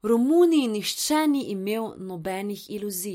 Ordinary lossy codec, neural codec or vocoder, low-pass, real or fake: MP3, 64 kbps; none; 19.8 kHz; real